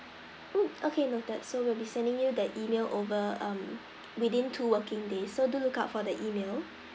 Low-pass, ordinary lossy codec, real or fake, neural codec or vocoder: none; none; real; none